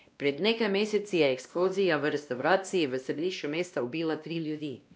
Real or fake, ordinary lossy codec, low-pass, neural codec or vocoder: fake; none; none; codec, 16 kHz, 1 kbps, X-Codec, WavLM features, trained on Multilingual LibriSpeech